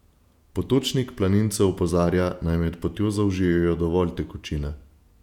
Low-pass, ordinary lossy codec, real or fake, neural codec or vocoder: 19.8 kHz; none; fake; vocoder, 48 kHz, 128 mel bands, Vocos